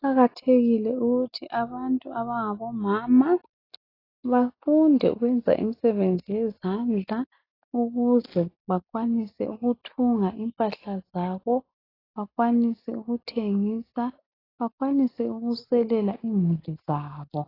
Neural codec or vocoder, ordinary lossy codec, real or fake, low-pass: none; AAC, 24 kbps; real; 5.4 kHz